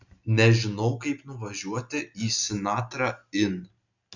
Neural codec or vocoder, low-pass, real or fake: none; 7.2 kHz; real